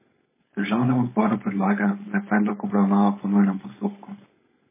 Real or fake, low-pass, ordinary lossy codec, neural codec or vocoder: fake; 3.6 kHz; MP3, 16 kbps; codec, 16 kHz, 4.8 kbps, FACodec